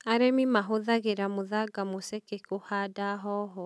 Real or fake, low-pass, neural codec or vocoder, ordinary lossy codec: real; none; none; none